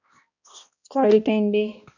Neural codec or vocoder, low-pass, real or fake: codec, 16 kHz, 1 kbps, X-Codec, HuBERT features, trained on balanced general audio; 7.2 kHz; fake